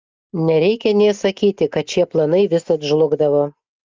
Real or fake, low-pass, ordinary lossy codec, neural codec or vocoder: real; 7.2 kHz; Opus, 24 kbps; none